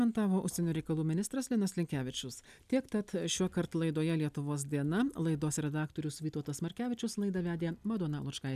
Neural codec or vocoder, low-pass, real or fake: none; 14.4 kHz; real